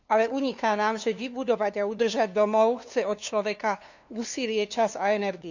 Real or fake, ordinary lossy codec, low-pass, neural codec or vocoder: fake; none; 7.2 kHz; codec, 16 kHz, 2 kbps, FunCodec, trained on LibriTTS, 25 frames a second